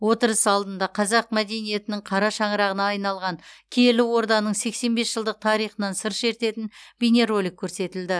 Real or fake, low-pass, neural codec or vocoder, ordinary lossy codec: real; none; none; none